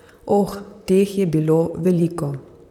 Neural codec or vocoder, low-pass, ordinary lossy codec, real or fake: vocoder, 44.1 kHz, 128 mel bands, Pupu-Vocoder; 19.8 kHz; none; fake